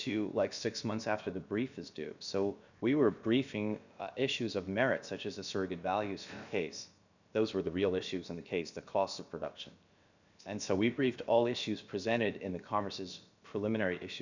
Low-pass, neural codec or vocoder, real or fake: 7.2 kHz; codec, 16 kHz, about 1 kbps, DyCAST, with the encoder's durations; fake